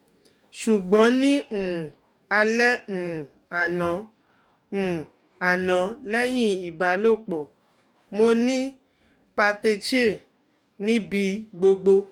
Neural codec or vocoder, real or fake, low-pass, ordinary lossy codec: codec, 44.1 kHz, 2.6 kbps, DAC; fake; 19.8 kHz; none